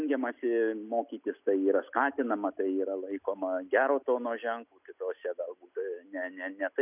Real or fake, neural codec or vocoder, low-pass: real; none; 3.6 kHz